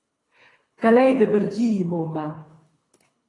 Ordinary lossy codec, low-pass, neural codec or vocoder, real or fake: AAC, 32 kbps; 10.8 kHz; codec, 24 kHz, 3 kbps, HILCodec; fake